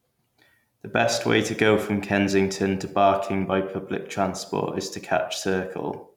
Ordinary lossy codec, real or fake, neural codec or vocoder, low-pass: none; real; none; 19.8 kHz